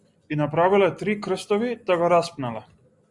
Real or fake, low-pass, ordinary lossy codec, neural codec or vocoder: real; 10.8 kHz; Opus, 64 kbps; none